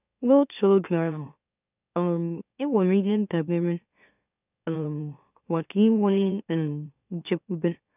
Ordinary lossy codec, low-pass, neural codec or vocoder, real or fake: none; 3.6 kHz; autoencoder, 44.1 kHz, a latent of 192 numbers a frame, MeloTTS; fake